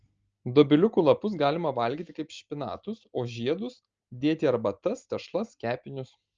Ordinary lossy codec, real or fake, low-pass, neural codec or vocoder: Opus, 24 kbps; real; 7.2 kHz; none